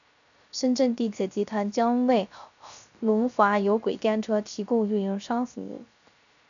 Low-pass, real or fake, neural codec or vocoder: 7.2 kHz; fake; codec, 16 kHz, 0.3 kbps, FocalCodec